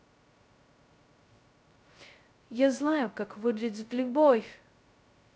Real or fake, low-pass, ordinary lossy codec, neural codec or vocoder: fake; none; none; codec, 16 kHz, 0.2 kbps, FocalCodec